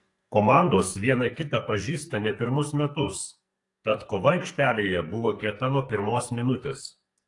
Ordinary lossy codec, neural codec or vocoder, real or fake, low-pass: AAC, 48 kbps; codec, 44.1 kHz, 2.6 kbps, SNAC; fake; 10.8 kHz